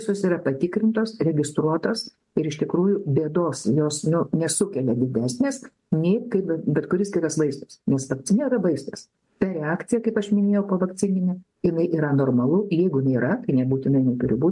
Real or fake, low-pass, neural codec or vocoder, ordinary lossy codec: real; 10.8 kHz; none; MP3, 64 kbps